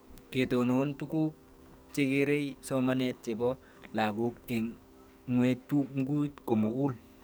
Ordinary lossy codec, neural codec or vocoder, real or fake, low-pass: none; codec, 44.1 kHz, 2.6 kbps, SNAC; fake; none